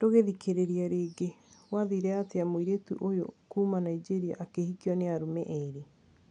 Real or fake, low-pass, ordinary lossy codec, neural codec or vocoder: real; 9.9 kHz; none; none